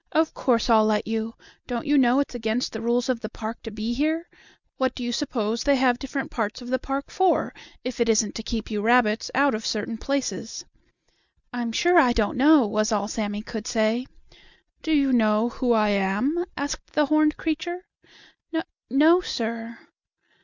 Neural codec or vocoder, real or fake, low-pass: none; real; 7.2 kHz